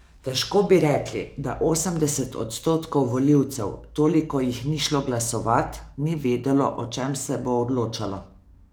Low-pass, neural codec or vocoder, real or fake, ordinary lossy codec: none; codec, 44.1 kHz, 7.8 kbps, DAC; fake; none